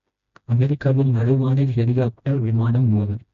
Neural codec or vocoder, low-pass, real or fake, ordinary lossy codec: codec, 16 kHz, 1 kbps, FreqCodec, smaller model; 7.2 kHz; fake; AAC, 64 kbps